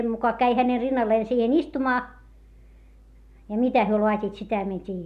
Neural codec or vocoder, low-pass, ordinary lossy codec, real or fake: none; 14.4 kHz; none; real